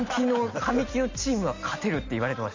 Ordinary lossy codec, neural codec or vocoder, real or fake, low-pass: MP3, 64 kbps; none; real; 7.2 kHz